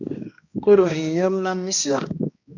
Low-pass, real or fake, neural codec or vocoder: 7.2 kHz; fake; codec, 16 kHz, 1 kbps, X-Codec, HuBERT features, trained on balanced general audio